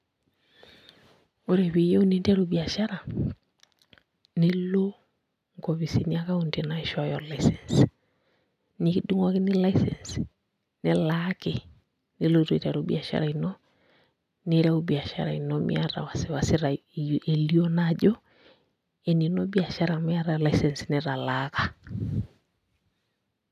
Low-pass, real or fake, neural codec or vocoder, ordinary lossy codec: 14.4 kHz; real; none; none